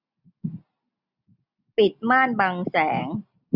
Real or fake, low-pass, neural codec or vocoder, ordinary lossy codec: real; 5.4 kHz; none; none